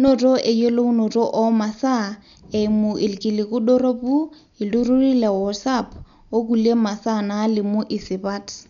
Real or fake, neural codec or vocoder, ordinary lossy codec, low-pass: real; none; none; 7.2 kHz